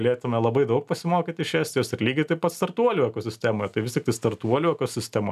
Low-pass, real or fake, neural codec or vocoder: 14.4 kHz; real; none